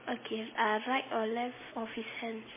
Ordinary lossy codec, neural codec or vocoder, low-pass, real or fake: MP3, 16 kbps; none; 3.6 kHz; real